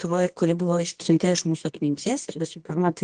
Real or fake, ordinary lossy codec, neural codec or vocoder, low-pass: fake; Opus, 24 kbps; codec, 24 kHz, 0.9 kbps, WavTokenizer, medium music audio release; 10.8 kHz